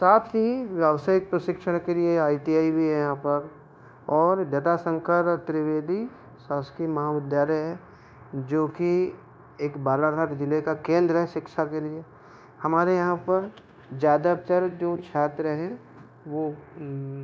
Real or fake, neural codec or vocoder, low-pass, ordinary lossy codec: fake; codec, 16 kHz, 0.9 kbps, LongCat-Audio-Codec; none; none